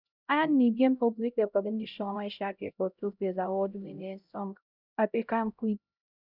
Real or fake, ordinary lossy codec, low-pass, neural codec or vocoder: fake; none; 5.4 kHz; codec, 16 kHz, 0.5 kbps, X-Codec, HuBERT features, trained on LibriSpeech